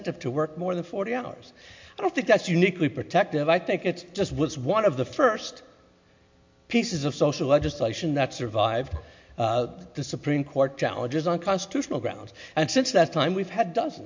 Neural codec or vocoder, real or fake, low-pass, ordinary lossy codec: none; real; 7.2 kHz; MP3, 48 kbps